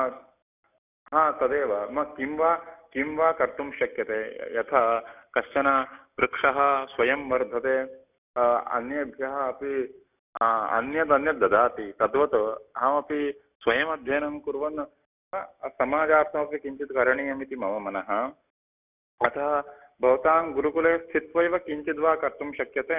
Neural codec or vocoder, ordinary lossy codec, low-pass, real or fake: none; none; 3.6 kHz; real